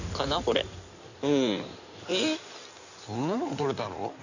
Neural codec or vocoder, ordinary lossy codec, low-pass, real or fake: codec, 16 kHz in and 24 kHz out, 2.2 kbps, FireRedTTS-2 codec; none; 7.2 kHz; fake